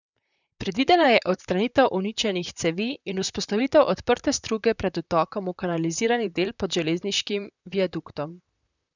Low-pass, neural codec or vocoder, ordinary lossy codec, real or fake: 7.2 kHz; vocoder, 44.1 kHz, 128 mel bands, Pupu-Vocoder; none; fake